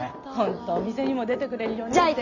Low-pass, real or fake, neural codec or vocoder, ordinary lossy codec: 7.2 kHz; real; none; none